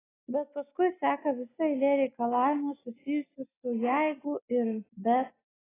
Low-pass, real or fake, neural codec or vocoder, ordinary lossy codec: 3.6 kHz; real; none; AAC, 16 kbps